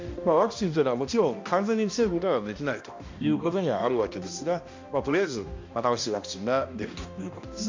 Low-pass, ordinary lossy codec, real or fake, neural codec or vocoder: 7.2 kHz; MP3, 48 kbps; fake; codec, 16 kHz, 1 kbps, X-Codec, HuBERT features, trained on balanced general audio